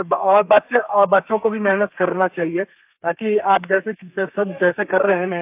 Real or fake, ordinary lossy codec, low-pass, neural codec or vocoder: fake; AAC, 32 kbps; 3.6 kHz; codec, 32 kHz, 1.9 kbps, SNAC